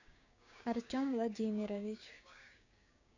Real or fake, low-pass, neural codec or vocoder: fake; 7.2 kHz; codec, 16 kHz, 6 kbps, DAC